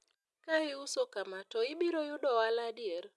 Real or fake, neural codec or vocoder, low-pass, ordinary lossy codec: real; none; none; none